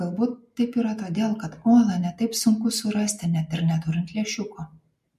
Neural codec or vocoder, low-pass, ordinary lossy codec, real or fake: none; 14.4 kHz; MP3, 64 kbps; real